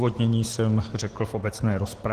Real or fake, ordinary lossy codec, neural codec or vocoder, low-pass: real; Opus, 16 kbps; none; 14.4 kHz